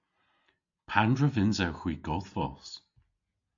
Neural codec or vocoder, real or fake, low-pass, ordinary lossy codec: none; real; 7.2 kHz; AAC, 64 kbps